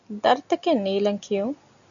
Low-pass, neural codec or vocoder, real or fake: 7.2 kHz; none; real